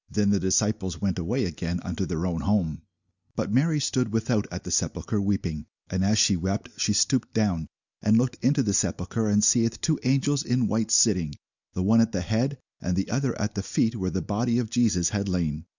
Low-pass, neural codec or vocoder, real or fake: 7.2 kHz; none; real